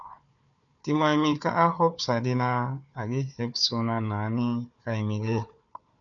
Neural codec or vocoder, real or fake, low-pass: codec, 16 kHz, 4 kbps, FunCodec, trained on Chinese and English, 50 frames a second; fake; 7.2 kHz